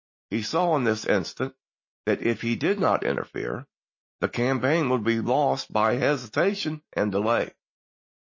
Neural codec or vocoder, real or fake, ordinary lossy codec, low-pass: none; real; MP3, 32 kbps; 7.2 kHz